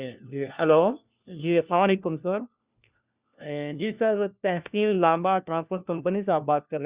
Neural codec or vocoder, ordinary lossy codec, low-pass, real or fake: codec, 16 kHz, 1 kbps, FunCodec, trained on LibriTTS, 50 frames a second; Opus, 64 kbps; 3.6 kHz; fake